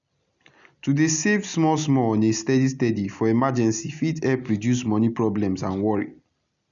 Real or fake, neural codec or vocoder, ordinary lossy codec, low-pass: real; none; none; 7.2 kHz